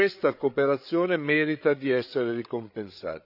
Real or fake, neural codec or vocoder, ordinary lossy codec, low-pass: fake; codec, 16 kHz, 8 kbps, FreqCodec, larger model; none; 5.4 kHz